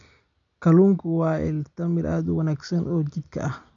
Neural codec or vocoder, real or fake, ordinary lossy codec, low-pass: none; real; none; 7.2 kHz